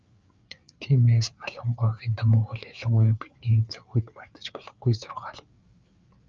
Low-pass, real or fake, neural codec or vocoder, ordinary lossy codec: 7.2 kHz; fake; codec, 16 kHz, 4 kbps, FreqCodec, larger model; Opus, 24 kbps